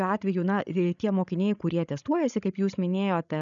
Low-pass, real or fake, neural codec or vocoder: 7.2 kHz; fake; codec, 16 kHz, 16 kbps, FreqCodec, larger model